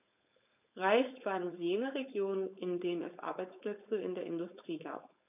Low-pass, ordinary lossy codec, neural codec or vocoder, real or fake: 3.6 kHz; none; codec, 16 kHz, 4.8 kbps, FACodec; fake